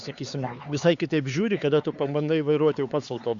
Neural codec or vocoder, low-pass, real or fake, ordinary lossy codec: codec, 16 kHz, 4 kbps, X-Codec, HuBERT features, trained on LibriSpeech; 7.2 kHz; fake; Opus, 64 kbps